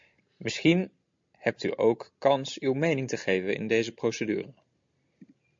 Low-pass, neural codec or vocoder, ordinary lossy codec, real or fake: 7.2 kHz; none; MP3, 48 kbps; real